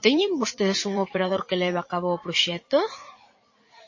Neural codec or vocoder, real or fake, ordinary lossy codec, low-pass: vocoder, 44.1 kHz, 80 mel bands, Vocos; fake; MP3, 32 kbps; 7.2 kHz